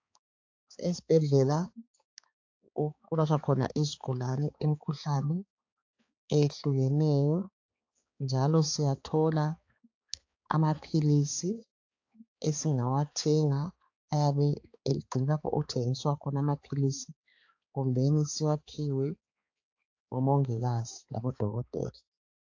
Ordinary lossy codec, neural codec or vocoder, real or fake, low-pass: AAC, 48 kbps; codec, 16 kHz, 4 kbps, X-Codec, HuBERT features, trained on balanced general audio; fake; 7.2 kHz